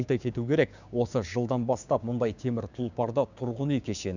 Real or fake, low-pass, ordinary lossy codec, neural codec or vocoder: fake; 7.2 kHz; none; codec, 16 kHz, 6 kbps, DAC